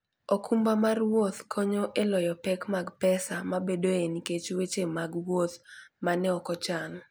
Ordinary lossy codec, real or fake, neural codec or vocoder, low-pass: none; real; none; none